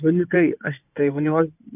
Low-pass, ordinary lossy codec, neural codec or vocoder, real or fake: 3.6 kHz; none; codec, 16 kHz in and 24 kHz out, 2.2 kbps, FireRedTTS-2 codec; fake